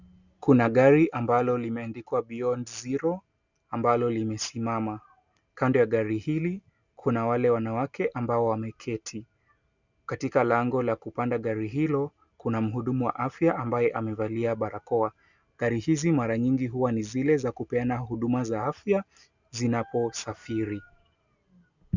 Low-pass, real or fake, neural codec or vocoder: 7.2 kHz; real; none